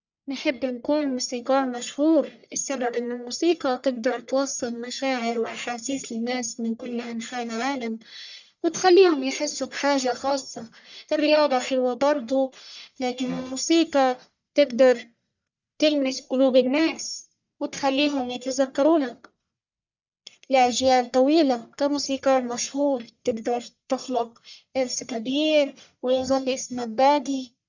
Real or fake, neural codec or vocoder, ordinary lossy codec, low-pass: fake; codec, 44.1 kHz, 1.7 kbps, Pupu-Codec; none; 7.2 kHz